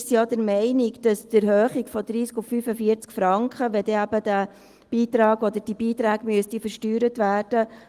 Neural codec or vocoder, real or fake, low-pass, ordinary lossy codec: none; real; 14.4 kHz; Opus, 24 kbps